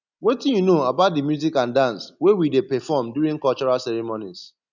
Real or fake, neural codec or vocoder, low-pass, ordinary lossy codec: real; none; 7.2 kHz; none